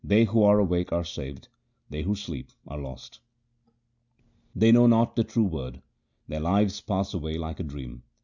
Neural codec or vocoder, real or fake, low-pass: none; real; 7.2 kHz